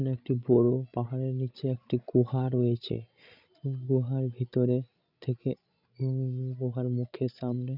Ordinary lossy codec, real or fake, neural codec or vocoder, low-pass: none; fake; codec, 16 kHz, 16 kbps, FreqCodec, larger model; 5.4 kHz